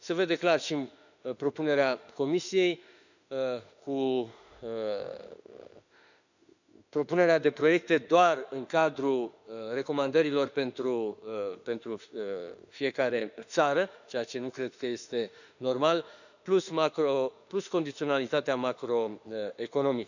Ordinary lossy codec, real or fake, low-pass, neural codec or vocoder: none; fake; 7.2 kHz; autoencoder, 48 kHz, 32 numbers a frame, DAC-VAE, trained on Japanese speech